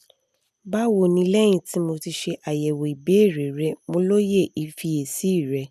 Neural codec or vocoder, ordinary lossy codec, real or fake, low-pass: none; none; real; 14.4 kHz